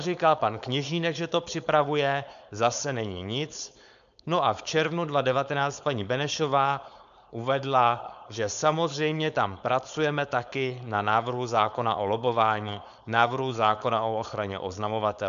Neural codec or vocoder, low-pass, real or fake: codec, 16 kHz, 4.8 kbps, FACodec; 7.2 kHz; fake